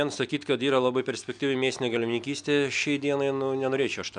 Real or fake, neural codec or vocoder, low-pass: real; none; 9.9 kHz